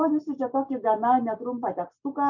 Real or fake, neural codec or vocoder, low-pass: real; none; 7.2 kHz